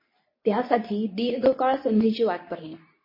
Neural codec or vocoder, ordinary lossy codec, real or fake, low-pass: codec, 24 kHz, 0.9 kbps, WavTokenizer, medium speech release version 2; MP3, 24 kbps; fake; 5.4 kHz